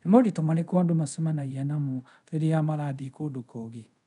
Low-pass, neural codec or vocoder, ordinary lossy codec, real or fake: 10.8 kHz; codec, 24 kHz, 0.5 kbps, DualCodec; none; fake